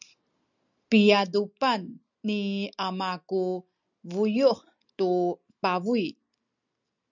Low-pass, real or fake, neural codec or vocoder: 7.2 kHz; real; none